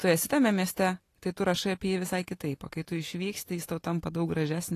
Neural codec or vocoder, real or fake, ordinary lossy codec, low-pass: none; real; AAC, 48 kbps; 14.4 kHz